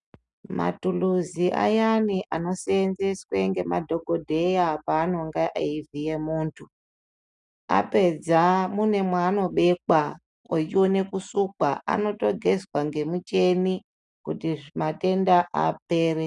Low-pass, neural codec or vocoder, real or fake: 10.8 kHz; none; real